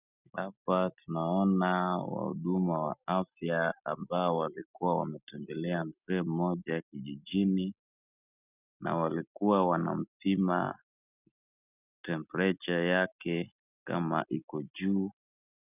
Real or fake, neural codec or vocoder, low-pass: real; none; 3.6 kHz